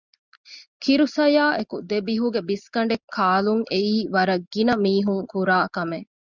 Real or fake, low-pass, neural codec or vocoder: real; 7.2 kHz; none